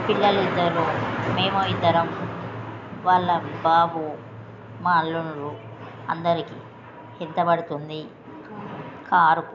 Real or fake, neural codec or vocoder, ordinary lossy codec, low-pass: real; none; none; 7.2 kHz